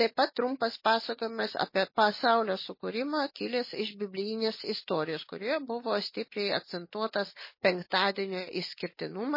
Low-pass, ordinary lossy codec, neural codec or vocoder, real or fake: 5.4 kHz; MP3, 24 kbps; none; real